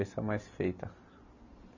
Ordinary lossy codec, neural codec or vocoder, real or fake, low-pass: none; none; real; 7.2 kHz